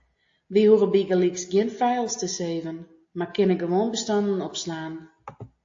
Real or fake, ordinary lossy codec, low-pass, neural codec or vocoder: real; AAC, 48 kbps; 7.2 kHz; none